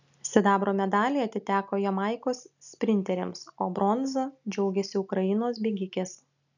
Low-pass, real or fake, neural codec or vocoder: 7.2 kHz; real; none